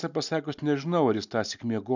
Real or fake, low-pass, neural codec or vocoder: real; 7.2 kHz; none